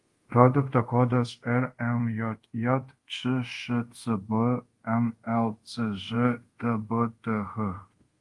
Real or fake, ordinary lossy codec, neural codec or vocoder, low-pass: fake; Opus, 24 kbps; codec, 24 kHz, 0.5 kbps, DualCodec; 10.8 kHz